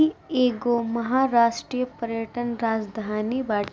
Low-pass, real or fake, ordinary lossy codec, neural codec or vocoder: none; real; none; none